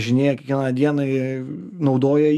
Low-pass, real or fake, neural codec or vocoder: 14.4 kHz; fake; autoencoder, 48 kHz, 128 numbers a frame, DAC-VAE, trained on Japanese speech